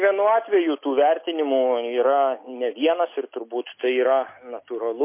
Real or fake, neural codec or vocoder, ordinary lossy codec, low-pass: real; none; MP3, 24 kbps; 3.6 kHz